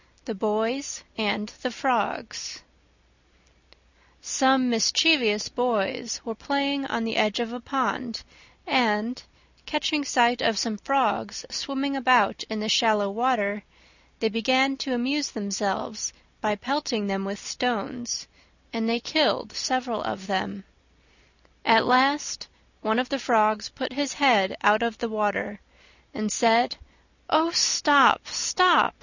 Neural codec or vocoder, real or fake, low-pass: none; real; 7.2 kHz